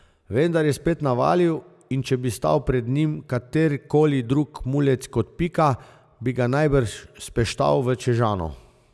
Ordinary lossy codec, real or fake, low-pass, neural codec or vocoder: none; real; none; none